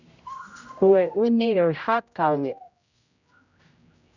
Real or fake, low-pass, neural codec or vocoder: fake; 7.2 kHz; codec, 16 kHz, 0.5 kbps, X-Codec, HuBERT features, trained on general audio